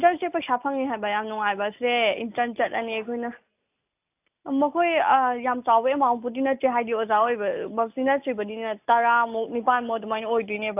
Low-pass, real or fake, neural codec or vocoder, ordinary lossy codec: 3.6 kHz; real; none; AAC, 32 kbps